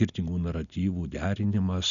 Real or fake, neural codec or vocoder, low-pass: real; none; 7.2 kHz